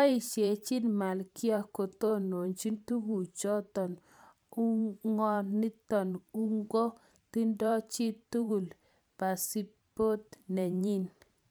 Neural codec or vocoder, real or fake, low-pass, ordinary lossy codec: vocoder, 44.1 kHz, 128 mel bands every 512 samples, BigVGAN v2; fake; none; none